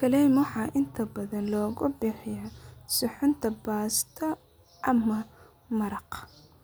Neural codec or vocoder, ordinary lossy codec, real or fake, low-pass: none; none; real; none